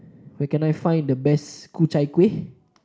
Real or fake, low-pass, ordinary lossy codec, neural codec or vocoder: real; none; none; none